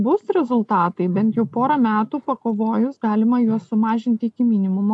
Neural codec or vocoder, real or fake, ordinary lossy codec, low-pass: none; real; AAC, 64 kbps; 10.8 kHz